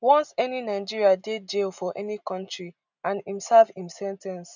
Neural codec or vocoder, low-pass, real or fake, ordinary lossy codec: none; 7.2 kHz; real; none